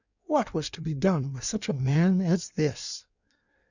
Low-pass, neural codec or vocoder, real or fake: 7.2 kHz; codec, 16 kHz in and 24 kHz out, 1.1 kbps, FireRedTTS-2 codec; fake